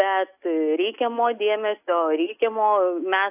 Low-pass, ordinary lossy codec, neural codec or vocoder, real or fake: 3.6 kHz; AAC, 32 kbps; none; real